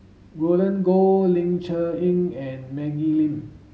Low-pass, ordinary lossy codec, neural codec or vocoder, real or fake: none; none; none; real